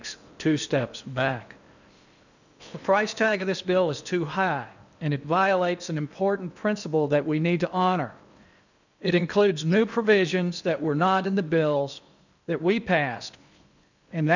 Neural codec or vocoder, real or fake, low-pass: codec, 16 kHz in and 24 kHz out, 0.8 kbps, FocalCodec, streaming, 65536 codes; fake; 7.2 kHz